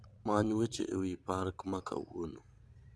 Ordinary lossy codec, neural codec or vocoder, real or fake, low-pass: none; vocoder, 22.05 kHz, 80 mel bands, Vocos; fake; none